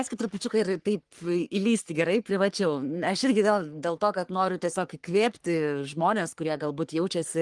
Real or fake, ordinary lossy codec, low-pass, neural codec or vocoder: fake; Opus, 24 kbps; 10.8 kHz; codec, 44.1 kHz, 3.4 kbps, Pupu-Codec